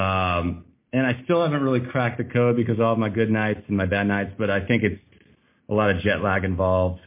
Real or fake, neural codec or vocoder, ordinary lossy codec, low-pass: real; none; MP3, 24 kbps; 3.6 kHz